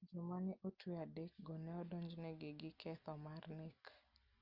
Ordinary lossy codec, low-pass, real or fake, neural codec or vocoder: Opus, 32 kbps; 5.4 kHz; real; none